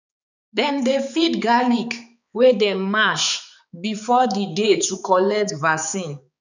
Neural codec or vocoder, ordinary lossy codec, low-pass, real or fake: codec, 16 kHz, 4 kbps, X-Codec, HuBERT features, trained on balanced general audio; none; 7.2 kHz; fake